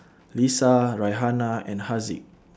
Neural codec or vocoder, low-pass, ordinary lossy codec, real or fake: none; none; none; real